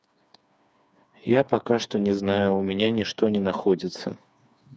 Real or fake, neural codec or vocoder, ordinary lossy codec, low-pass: fake; codec, 16 kHz, 4 kbps, FreqCodec, smaller model; none; none